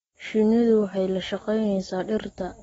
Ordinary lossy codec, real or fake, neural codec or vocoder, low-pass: AAC, 24 kbps; real; none; 19.8 kHz